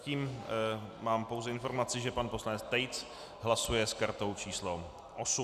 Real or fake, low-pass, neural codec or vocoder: real; 14.4 kHz; none